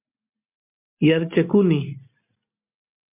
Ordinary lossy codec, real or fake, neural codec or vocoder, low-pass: MP3, 32 kbps; real; none; 3.6 kHz